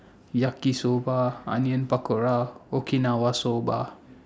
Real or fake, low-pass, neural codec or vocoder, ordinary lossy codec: real; none; none; none